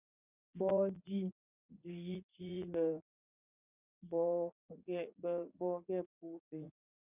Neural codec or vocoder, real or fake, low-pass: vocoder, 44.1 kHz, 80 mel bands, Vocos; fake; 3.6 kHz